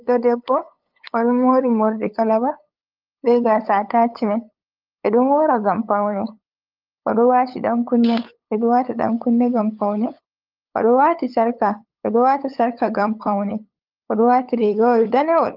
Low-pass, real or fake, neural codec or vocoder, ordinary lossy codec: 5.4 kHz; fake; codec, 16 kHz, 8 kbps, FunCodec, trained on LibriTTS, 25 frames a second; Opus, 24 kbps